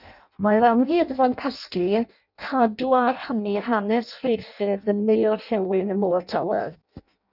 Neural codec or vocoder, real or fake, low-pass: codec, 16 kHz in and 24 kHz out, 0.6 kbps, FireRedTTS-2 codec; fake; 5.4 kHz